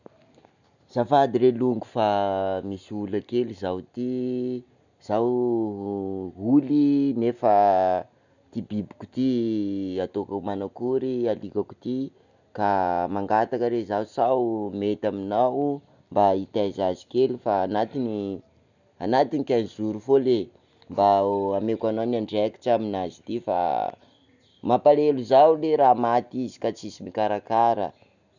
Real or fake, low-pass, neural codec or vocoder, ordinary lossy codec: real; 7.2 kHz; none; none